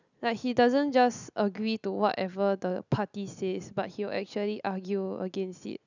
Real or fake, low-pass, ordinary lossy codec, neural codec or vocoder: real; 7.2 kHz; none; none